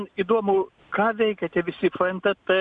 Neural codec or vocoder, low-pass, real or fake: none; 9.9 kHz; real